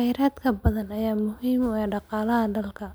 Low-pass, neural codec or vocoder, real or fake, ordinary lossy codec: none; none; real; none